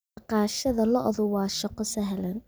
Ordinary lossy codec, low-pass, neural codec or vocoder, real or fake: none; none; none; real